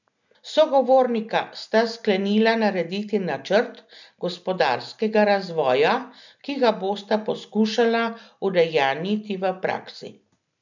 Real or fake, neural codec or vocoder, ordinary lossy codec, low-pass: real; none; none; 7.2 kHz